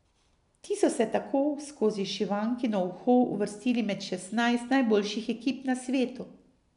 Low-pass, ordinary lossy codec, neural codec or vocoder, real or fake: 10.8 kHz; none; none; real